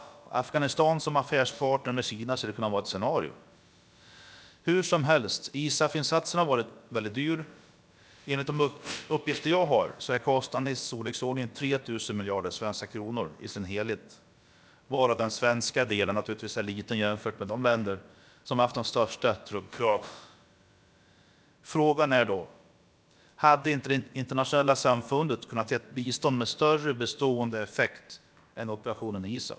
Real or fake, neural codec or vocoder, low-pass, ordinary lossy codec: fake; codec, 16 kHz, about 1 kbps, DyCAST, with the encoder's durations; none; none